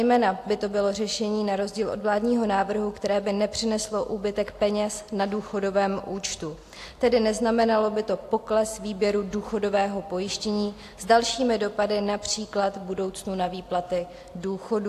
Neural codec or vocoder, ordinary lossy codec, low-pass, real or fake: none; AAC, 64 kbps; 14.4 kHz; real